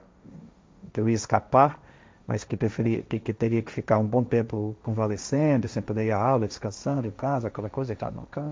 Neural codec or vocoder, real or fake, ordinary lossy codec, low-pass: codec, 16 kHz, 1.1 kbps, Voila-Tokenizer; fake; none; none